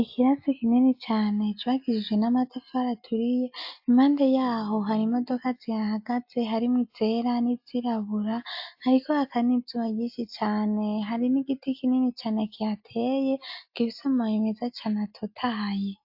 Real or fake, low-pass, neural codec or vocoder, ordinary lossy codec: real; 5.4 kHz; none; AAC, 48 kbps